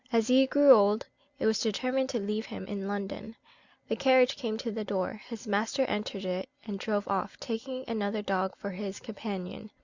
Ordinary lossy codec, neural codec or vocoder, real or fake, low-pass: Opus, 64 kbps; none; real; 7.2 kHz